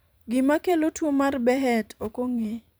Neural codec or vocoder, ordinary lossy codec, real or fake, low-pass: vocoder, 44.1 kHz, 128 mel bands every 256 samples, BigVGAN v2; none; fake; none